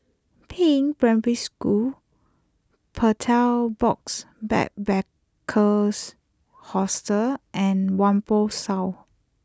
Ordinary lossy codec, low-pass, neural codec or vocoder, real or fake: none; none; none; real